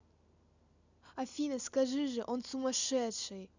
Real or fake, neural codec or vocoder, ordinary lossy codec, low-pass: real; none; none; 7.2 kHz